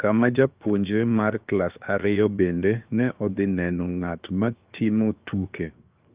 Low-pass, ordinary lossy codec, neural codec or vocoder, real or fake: 3.6 kHz; Opus, 32 kbps; codec, 16 kHz, 0.7 kbps, FocalCodec; fake